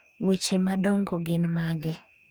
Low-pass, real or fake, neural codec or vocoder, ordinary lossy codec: none; fake; codec, 44.1 kHz, 2.6 kbps, DAC; none